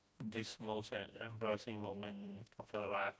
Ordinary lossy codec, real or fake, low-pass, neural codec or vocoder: none; fake; none; codec, 16 kHz, 1 kbps, FreqCodec, smaller model